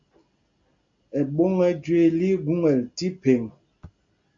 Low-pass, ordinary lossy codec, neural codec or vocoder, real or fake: 7.2 kHz; MP3, 48 kbps; none; real